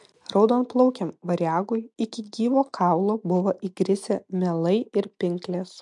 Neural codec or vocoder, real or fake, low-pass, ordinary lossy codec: none; real; 10.8 kHz; MP3, 64 kbps